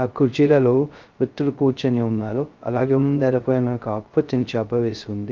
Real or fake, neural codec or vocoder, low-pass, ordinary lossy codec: fake; codec, 16 kHz, 0.2 kbps, FocalCodec; 7.2 kHz; Opus, 32 kbps